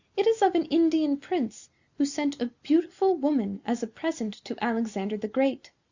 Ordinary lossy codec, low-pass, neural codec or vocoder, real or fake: Opus, 64 kbps; 7.2 kHz; none; real